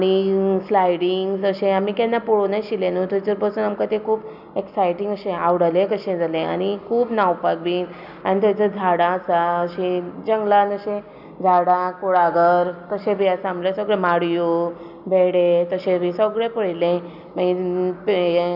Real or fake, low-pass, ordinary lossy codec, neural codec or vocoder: real; 5.4 kHz; none; none